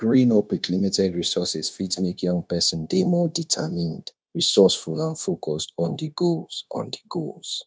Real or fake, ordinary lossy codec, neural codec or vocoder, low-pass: fake; none; codec, 16 kHz, 0.9 kbps, LongCat-Audio-Codec; none